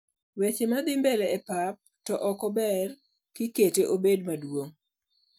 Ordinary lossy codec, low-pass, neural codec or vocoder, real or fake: none; none; none; real